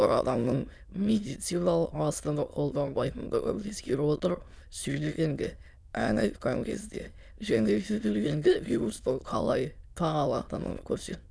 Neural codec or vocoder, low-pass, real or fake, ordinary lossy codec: autoencoder, 22.05 kHz, a latent of 192 numbers a frame, VITS, trained on many speakers; none; fake; none